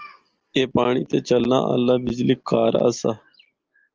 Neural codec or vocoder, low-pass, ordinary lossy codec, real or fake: none; 7.2 kHz; Opus, 24 kbps; real